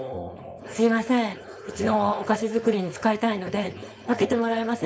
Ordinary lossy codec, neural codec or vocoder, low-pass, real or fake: none; codec, 16 kHz, 4.8 kbps, FACodec; none; fake